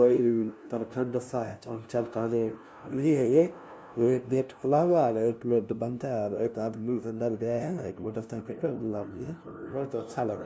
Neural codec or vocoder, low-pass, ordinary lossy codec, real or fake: codec, 16 kHz, 0.5 kbps, FunCodec, trained on LibriTTS, 25 frames a second; none; none; fake